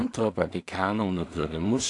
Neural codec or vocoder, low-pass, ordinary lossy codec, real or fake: codec, 16 kHz in and 24 kHz out, 0.4 kbps, LongCat-Audio-Codec, two codebook decoder; 10.8 kHz; AAC, 64 kbps; fake